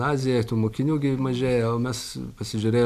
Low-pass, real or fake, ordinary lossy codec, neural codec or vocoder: 14.4 kHz; real; AAC, 96 kbps; none